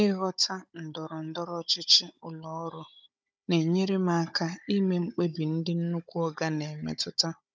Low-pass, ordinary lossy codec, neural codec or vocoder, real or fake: none; none; codec, 16 kHz, 16 kbps, FunCodec, trained on Chinese and English, 50 frames a second; fake